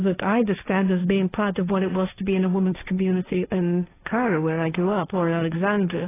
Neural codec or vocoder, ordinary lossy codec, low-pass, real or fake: codec, 16 kHz, 1.1 kbps, Voila-Tokenizer; AAC, 16 kbps; 3.6 kHz; fake